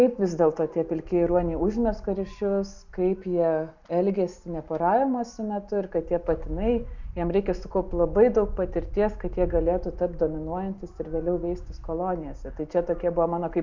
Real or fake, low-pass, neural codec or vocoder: real; 7.2 kHz; none